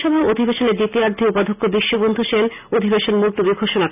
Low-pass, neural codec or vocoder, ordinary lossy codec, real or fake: 3.6 kHz; none; none; real